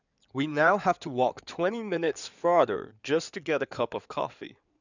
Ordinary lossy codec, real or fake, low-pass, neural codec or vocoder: none; fake; 7.2 kHz; codec, 16 kHz in and 24 kHz out, 2.2 kbps, FireRedTTS-2 codec